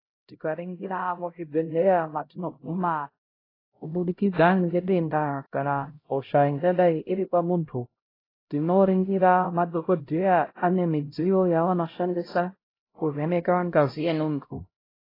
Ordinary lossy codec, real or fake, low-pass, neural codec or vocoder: AAC, 24 kbps; fake; 5.4 kHz; codec, 16 kHz, 0.5 kbps, X-Codec, HuBERT features, trained on LibriSpeech